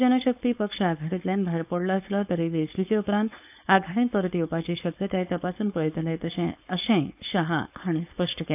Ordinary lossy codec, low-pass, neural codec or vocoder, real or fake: AAC, 32 kbps; 3.6 kHz; codec, 16 kHz, 4.8 kbps, FACodec; fake